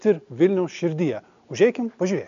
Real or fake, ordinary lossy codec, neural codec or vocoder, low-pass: real; AAC, 64 kbps; none; 7.2 kHz